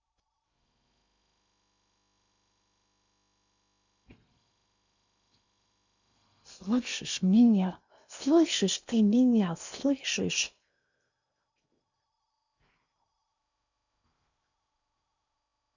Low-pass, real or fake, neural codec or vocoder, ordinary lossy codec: 7.2 kHz; fake; codec, 16 kHz in and 24 kHz out, 0.8 kbps, FocalCodec, streaming, 65536 codes; none